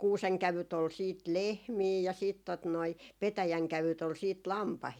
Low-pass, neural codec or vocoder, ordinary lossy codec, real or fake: 19.8 kHz; none; none; real